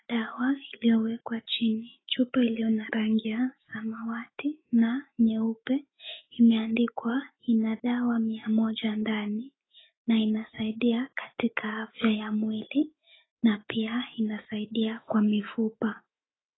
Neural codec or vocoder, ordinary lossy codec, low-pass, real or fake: autoencoder, 48 kHz, 128 numbers a frame, DAC-VAE, trained on Japanese speech; AAC, 16 kbps; 7.2 kHz; fake